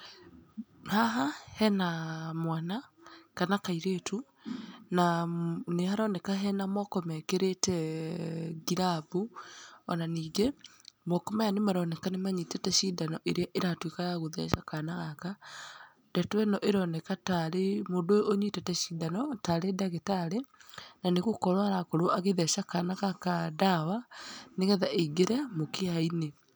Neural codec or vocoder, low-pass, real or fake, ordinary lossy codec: none; none; real; none